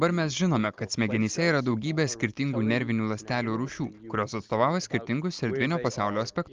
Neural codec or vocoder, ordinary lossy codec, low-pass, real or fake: none; Opus, 32 kbps; 7.2 kHz; real